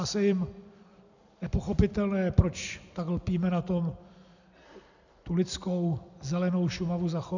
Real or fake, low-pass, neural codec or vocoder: real; 7.2 kHz; none